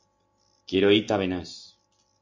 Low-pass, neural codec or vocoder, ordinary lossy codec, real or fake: 7.2 kHz; none; MP3, 48 kbps; real